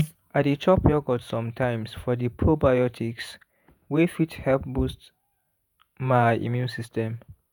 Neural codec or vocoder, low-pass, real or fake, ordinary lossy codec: vocoder, 48 kHz, 128 mel bands, Vocos; none; fake; none